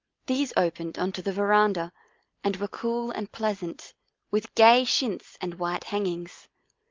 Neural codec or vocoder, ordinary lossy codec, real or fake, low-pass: none; Opus, 32 kbps; real; 7.2 kHz